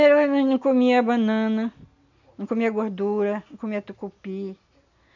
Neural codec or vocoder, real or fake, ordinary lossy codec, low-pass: none; real; none; 7.2 kHz